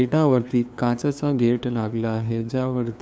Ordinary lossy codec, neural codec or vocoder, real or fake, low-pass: none; codec, 16 kHz, 2 kbps, FunCodec, trained on LibriTTS, 25 frames a second; fake; none